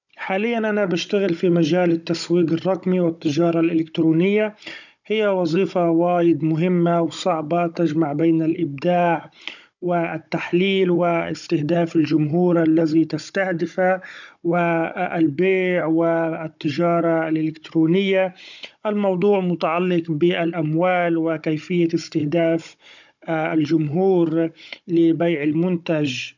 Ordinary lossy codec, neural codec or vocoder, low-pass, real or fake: none; codec, 16 kHz, 16 kbps, FunCodec, trained on Chinese and English, 50 frames a second; 7.2 kHz; fake